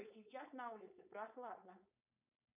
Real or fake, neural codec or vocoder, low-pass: fake; codec, 16 kHz, 4.8 kbps, FACodec; 3.6 kHz